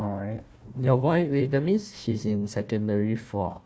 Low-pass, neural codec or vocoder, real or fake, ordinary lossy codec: none; codec, 16 kHz, 1 kbps, FunCodec, trained on Chinese and English, 50 frames a second; fake; none